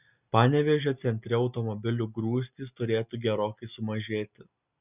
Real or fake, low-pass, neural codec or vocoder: real; 3.6 kHz; none